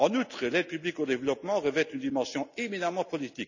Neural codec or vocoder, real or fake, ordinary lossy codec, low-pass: none; real; none; 7.2 kHz